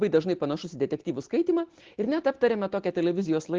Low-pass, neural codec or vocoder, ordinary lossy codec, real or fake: 7.2 kHz; none; Opus, 16 kbps; real